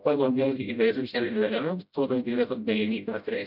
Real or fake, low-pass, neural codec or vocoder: fake; 5.4 kHz; codec, 16 kHz, 0.5 kbps, FreqCodec, smaller model